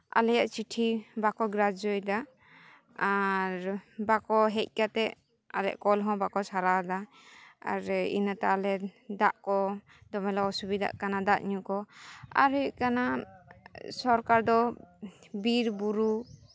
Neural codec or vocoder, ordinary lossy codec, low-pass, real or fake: none; none; none; real